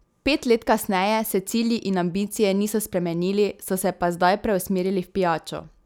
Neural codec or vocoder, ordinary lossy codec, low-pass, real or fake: none; none; none; real